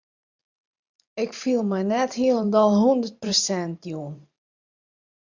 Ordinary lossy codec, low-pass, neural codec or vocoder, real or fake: MP3, 64 kbps; 7.2 kHz; vocoder, 44.1 kHz, 128 mel bands every 512 samples, BigVGAN v2; fake